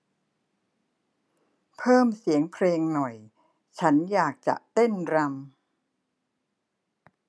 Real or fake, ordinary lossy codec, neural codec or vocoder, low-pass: real; none; none; none